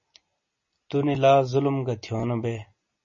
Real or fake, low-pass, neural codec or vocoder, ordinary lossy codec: real; 7.2 kHz; none; MP3, 32 kbps